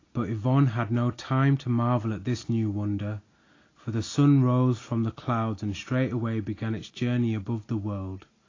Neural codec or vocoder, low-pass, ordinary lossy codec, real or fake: none; 7.2 kHz; AAC, 32 kbps; real